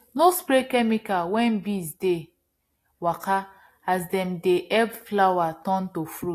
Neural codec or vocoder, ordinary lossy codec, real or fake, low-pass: none; AAC, 48 kbps; real; 14.4 kHz